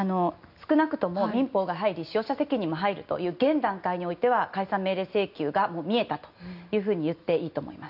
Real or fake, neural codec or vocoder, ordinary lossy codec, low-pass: real; none; none; 5.4 kHz